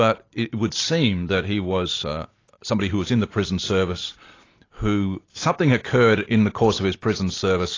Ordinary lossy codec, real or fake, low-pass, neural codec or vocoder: AAC, 32 kbps; real; 7.2 kHz; none